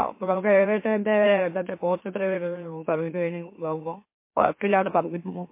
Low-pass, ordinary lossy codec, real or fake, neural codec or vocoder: 3.6 kHz; MP3, 24 kbps; fake; autoencoder, 44.1 kHz, a latent of 192 numbers a frame, MeloTTS